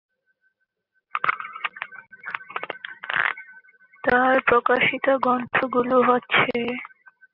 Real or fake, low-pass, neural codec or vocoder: real; 5.4 kHz; none